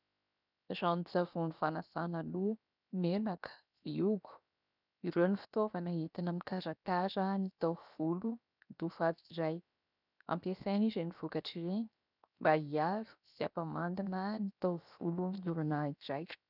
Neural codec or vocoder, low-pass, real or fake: codec, 16 kHz, 0.7 kbps, FocalCodec; 5.4 kHz; fake